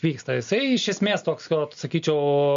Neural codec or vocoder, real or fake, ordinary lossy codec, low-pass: none; real; MP3, 48 kbps; 7.2 kHz